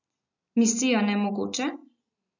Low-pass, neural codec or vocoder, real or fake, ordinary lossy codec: 7.2 kHz; none; real; none